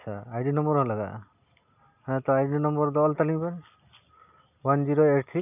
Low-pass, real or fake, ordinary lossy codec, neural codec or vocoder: 3.6 kHz; real; none; none